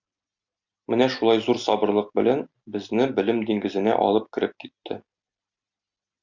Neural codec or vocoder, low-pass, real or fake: none; 7.2 kHz; real